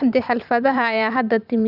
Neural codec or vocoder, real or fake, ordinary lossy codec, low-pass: vocoder, 44.1 kHz, 128 mel bands every 512 samples, BigVGAN v2; fake; none; 5.4 kHz